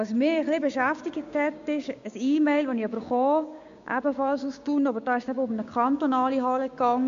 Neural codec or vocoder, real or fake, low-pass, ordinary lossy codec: codec, 16 kHz, 6 kbps, DAC; fake; 7.2 kHz; MP3, 48 kbps